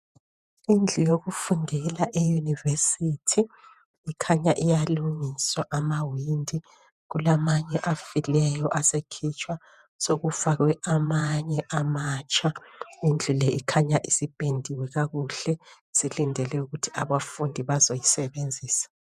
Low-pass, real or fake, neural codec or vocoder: 14.4 kHz; fake; vocoder, 48 kHz, 128 mel bands, Vocos